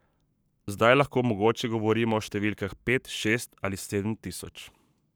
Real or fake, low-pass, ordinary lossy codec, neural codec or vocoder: fake; none; none; codec, 44.1 kHz, 7.8 kbps, Pupu-Codec